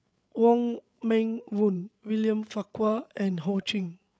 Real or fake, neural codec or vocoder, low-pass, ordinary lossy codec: fake; codec, 16 kHz, 8 kbps, FreqCodec, larger model; none; none